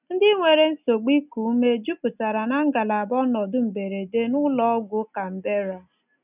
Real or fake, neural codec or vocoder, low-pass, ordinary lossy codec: real; none; 3.6 kHz; none